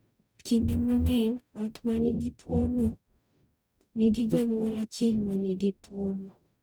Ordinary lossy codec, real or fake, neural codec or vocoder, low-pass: none; fake; codec, 44.1 kHz, 0.9 kbps, DAC; none